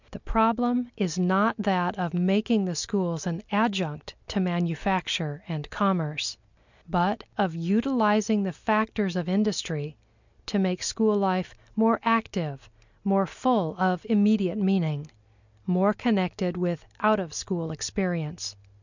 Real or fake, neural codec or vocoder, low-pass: real; none; 7.2 kHz